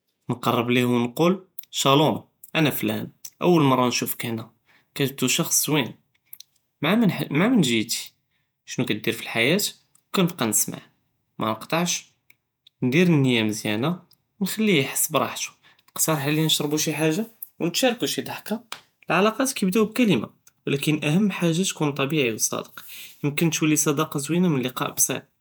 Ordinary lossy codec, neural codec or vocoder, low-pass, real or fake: none; vocoder, 48 kHz, 128 mel bands, Vocos; none; fake